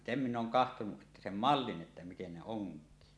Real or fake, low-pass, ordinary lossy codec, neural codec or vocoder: real; none; none; none